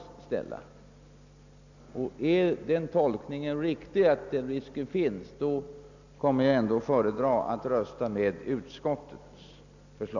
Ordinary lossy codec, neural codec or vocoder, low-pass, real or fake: none; none; 7.2 kHz; real